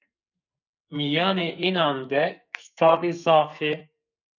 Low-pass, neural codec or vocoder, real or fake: 7.2 kHz; codec, 44.1 kHz, 2.6 kbps, SNAC; fake